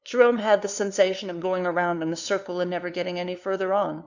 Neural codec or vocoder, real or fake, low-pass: codec, 16 kHz, 2 kbps, FunCodec, trained on LibriTTS, 25 frames a second; fake; 7.2 kHz